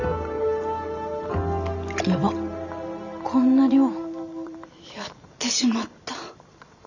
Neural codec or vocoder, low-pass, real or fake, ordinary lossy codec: vocoder, 44.1 kHz, 128 mel bands every 512 samples, BigVGAN v2; 7.2 kHz; fake; none